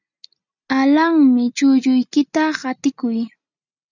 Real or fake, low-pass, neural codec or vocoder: real; 7.2 kHz; none